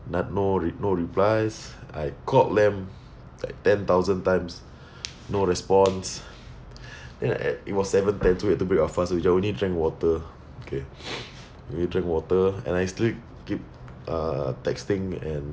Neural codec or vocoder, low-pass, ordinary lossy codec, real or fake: none; none; none; real